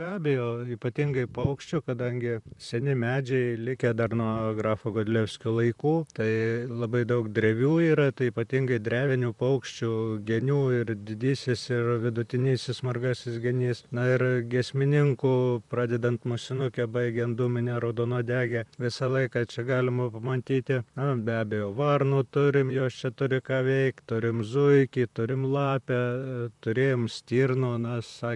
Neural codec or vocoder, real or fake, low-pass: vocoder, 44.1 kHz, 128 mel bands, Pupu-Vocoder; fake; 10.8 kHz